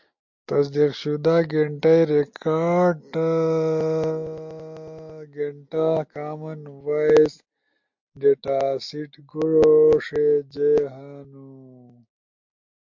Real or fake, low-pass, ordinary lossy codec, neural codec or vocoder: real; 7.2 kHz; MP3, 48 kbps; none